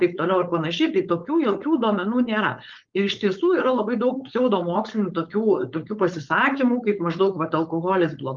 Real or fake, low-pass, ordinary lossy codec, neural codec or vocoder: fake; 7.2 kHz; Opus, 32 kbps; codec, 16 kHz, 4.8 kbps, FACodec